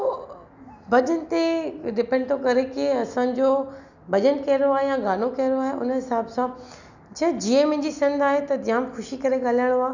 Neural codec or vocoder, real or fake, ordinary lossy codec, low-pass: none; real; none; 7.2 kHz